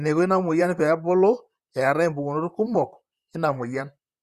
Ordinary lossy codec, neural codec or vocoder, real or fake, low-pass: Opus, 64 kbps; vocoder, 44.1 kHz, 128 mel bands, Pupu-Vocoder; fake; 14.4 kHz